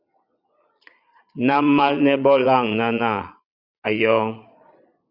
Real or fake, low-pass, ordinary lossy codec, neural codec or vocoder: fake; 5.4 kHz; Opus, 64 kbps; vocoder, 22.05 kHz, 80 mel bands, Vocos